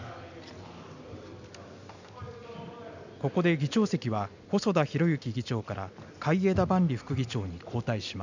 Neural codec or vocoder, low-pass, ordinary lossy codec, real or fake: none; 7.2 kHz; none; real